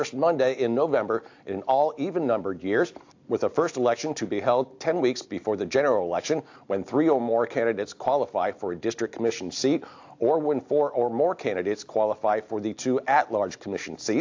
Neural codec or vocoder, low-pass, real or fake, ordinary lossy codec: none; 7.2 kHz; real; AAC, 48 kbps